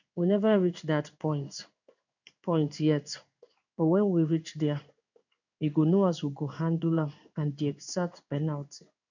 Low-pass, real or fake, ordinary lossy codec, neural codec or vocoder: 7.2 kHz; fake; none; codec, 16 kHz in and 24 kHz out, 1 kbps, XY-Tokenizer